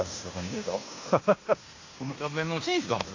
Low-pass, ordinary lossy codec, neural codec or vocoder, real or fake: 7.2 kHz; AAC, 48 kbps; codec, 16 kHz in and 24 kHz out, 0.9 kbps, LongCat-Audio-Codec, fine tuned four codebook decoder; fake